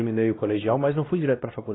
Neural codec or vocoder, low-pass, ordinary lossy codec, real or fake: codec, 16 kHz, 1 kbps, X-Codec, WavLM features, trained on Multilingual LibriSpeech; 7.2 kHz; AAC, 16 kbps; fake